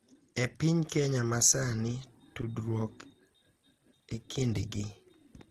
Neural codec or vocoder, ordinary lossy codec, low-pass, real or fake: none; Opus, 16 kbps; 14.4 kHz; real